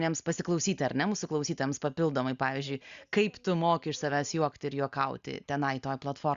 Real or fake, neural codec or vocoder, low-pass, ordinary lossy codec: real; none; 7.2 kHz; Opus, 64 kbps